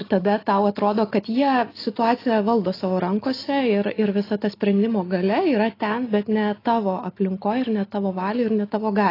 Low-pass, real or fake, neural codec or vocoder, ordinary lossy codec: 5.4 kHz; real; none; AAC, 24 kbps